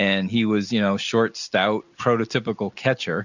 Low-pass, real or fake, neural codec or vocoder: 7.2 kHz; real; none